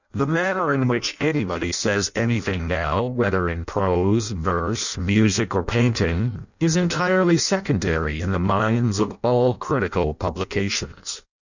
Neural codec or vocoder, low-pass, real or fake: codec, 16 kHz in and 24 kHz out, 0.6 kbps, FireRedTTS-2 codec; 7.2 kHz; fake